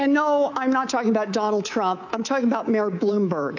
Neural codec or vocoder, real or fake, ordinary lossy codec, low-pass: codec, 24 kHz, 3.1 kbps, DualCodec; fake; AAC, 48 kbps; 7.2 kHz